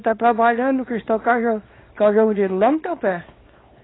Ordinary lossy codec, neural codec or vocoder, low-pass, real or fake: AAC, 16 kbps; codec, 24 kHz, 0.9 kbps, WavTokenizer, small release; 7.2 kHz; fake